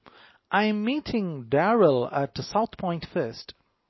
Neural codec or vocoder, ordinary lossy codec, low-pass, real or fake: none; MP3, 24 kbps; 7.2 kHz; real